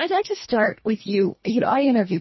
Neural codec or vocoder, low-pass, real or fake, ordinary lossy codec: codec, 24 kHz, 1.5 kbps, HILCodec; 7.2 kHz; fake; MP3, 24 kbps